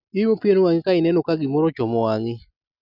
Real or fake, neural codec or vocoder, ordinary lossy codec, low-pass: real; none; none; 5.4 kHz